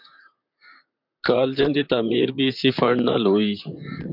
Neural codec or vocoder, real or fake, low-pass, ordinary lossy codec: vocoder, 44.1 kHz, 128 mel bands, Pupu-Vocoder; fake; 5.4 kHz; MP3, 48 kbps